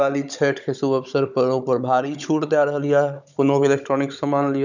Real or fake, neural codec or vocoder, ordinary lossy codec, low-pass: fake; codec, 16 kHz, 16 kbps, FunCodec, trained on Chinese and English, 50 frames a second; none; 7.2 kHz